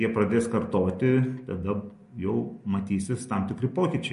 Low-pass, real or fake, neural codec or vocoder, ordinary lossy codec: 10.8 kHz; real; none; MP3, 48 kbps